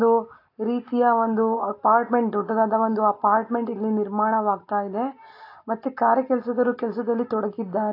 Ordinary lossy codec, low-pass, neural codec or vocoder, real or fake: AAC, 32 kbps; 5.4 kHz; none; real